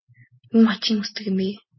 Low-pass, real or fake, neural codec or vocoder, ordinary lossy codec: 7.2 kHz; real; none; MP3, 24 kbps